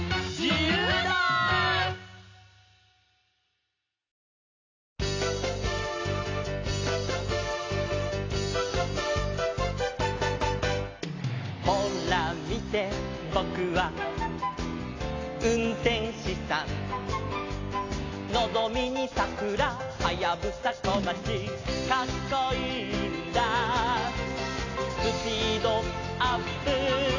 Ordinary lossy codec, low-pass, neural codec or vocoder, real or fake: AAC, 32 kbps; 7.2 kHz; none; real